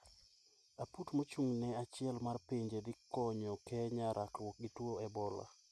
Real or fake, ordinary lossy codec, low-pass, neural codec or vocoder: real; none; none; none